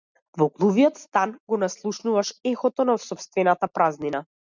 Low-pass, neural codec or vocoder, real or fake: 7.2 kHz; none; real